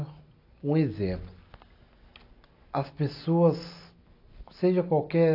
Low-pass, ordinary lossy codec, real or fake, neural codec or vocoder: 5.4 kHz; AAC, 32 kbps; real; none